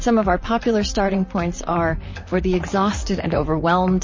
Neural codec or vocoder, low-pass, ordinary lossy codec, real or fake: vocoder, 44.1 kHz, 128 mel bands, Pupu-Vocoder; 7.2 kHz; MP3, 32 kbps; fake